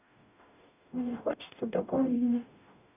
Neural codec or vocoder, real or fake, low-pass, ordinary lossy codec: codec, 44.1 kHz, 0.9 kbps, DAC; fake; 3.6 kHz; none